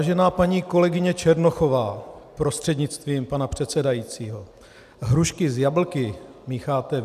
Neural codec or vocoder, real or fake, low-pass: none; real; 14.4 kHz